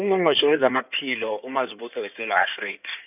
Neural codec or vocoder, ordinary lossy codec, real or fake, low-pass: codec, 16 kHz in and 24 kHz out, 2.2 kbps, FireRedTTS-2 codec; none; fake; 3.6 kHz